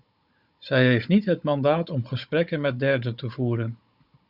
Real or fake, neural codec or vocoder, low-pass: fake; codec, 16 kHz, 16 kbps, FunCodec, trained on Chinese and English, 50 frames a second; 5.4 kHz